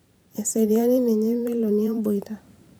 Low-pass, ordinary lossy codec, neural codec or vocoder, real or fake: none; none; vocoder, 44.1 kHz, 128 mel bands every 512 samples, BigVGAN v2; fake